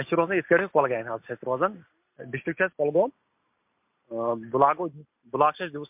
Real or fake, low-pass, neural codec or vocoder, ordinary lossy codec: real; 3.6 kHz; none; MP3, 32 kbps